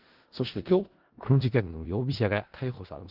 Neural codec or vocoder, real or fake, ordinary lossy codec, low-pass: codec, 16 kHz in and 24 kHz out, 0.4 kbps, LongCat-Audio-Codec, four codebook decoder; fake; Opus, 16 kbps; 5.4 kHz